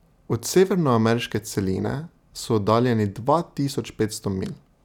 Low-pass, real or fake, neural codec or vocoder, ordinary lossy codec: 19.8 kHz; real; none; none